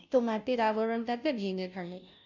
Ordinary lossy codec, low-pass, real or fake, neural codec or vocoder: none; 7.2 kHz; fake; codec, 16 kHz, 0.5 kbps, FunCodec, trained on Chinese and English, 25 frames a second